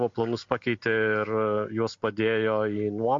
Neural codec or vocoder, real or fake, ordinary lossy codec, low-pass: none; real; MP3, 48 kbps; 7.2 kHz